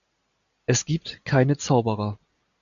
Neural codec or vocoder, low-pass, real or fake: none; 7.2 kHz; real